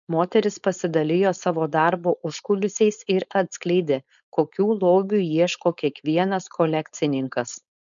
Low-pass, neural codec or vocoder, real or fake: 7.2 kHz; codec, 16 kHz, 4.8 kbps, FACodec; fake